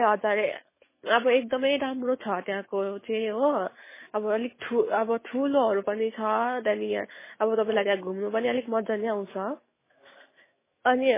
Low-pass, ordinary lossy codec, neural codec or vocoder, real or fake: 3.6 kHz; MP3, 16 kbps; autoencoder, 48 kHz, 128 numbers a frame, DAC-VAE, trained on Japanese speech; fake